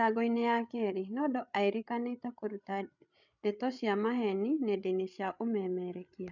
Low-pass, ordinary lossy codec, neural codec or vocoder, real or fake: 7.2 kHz; none; codec, 16 kHz, 8 kbps, FreqCodec, larger model; fake